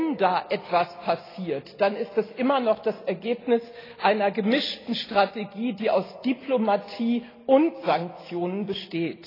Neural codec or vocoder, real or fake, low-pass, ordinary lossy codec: none; real; 5.4 kHz; AAC, 24 kbps